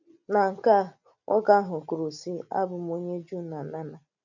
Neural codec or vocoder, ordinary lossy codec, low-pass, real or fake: none; none; 7.2 kHz; real